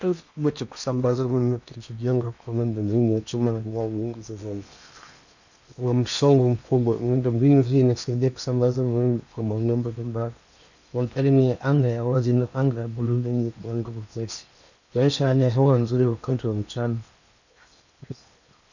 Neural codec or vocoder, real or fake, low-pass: codec, 16 kHz in and 24 kHz out, 0.8 kbps, FocalCodec, streaming, 65536 codes; fake; 7.2 kHz